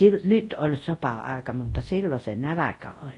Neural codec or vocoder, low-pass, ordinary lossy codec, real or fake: codec, 24 kHz, 0.9 kbps, WavTokenizer, large speech release; 10.8 kHz; AAC, 32 kbps; fake